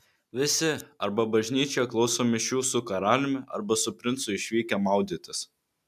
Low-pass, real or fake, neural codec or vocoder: 14.4 kHz; real; none